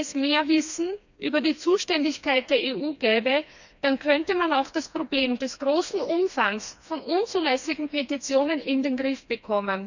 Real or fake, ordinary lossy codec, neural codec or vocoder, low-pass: fake; none; codec, 16 kHz, 2 kbps, FreqCodec, smaller model; 7.2 kHz